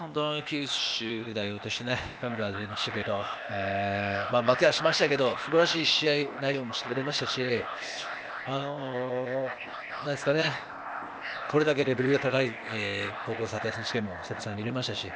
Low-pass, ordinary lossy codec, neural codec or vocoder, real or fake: none; none; codec, 16 kHz, 0.8 kbps, ZipCodec; fake